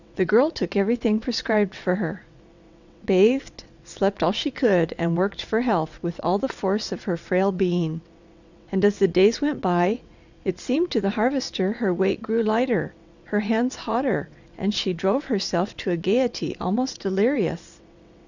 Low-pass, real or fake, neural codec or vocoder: 7.2 kHz; fake; vocoder, 22.05 kHz, 80 mel bands, WaveNeXt